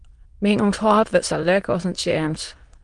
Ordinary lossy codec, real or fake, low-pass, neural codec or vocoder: Opus, 24 kbps; fake; 9.9 kHz; autoencoder, 22.05 kHz, a latent of 192 numbers a frame, VITS, trained on many speakers